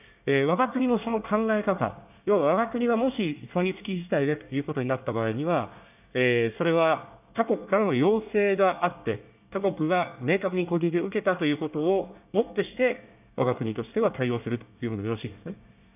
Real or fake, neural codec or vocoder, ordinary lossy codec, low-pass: fake; codec, 24 kHz, 1 kbps, SNAC; none; 3.6 kHz